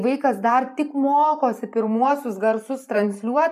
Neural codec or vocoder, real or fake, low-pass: vocoder, 44.1 kHz, 128 mel bands every 512 samples, BigVGAN v2; fake; 14.4 kHz